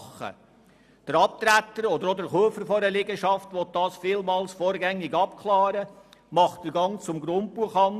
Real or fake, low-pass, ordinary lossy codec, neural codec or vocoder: real; 14.4 kHz; none; none